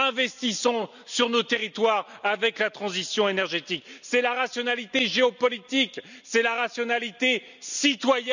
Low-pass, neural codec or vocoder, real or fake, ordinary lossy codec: 7.2 kHz; none; real; none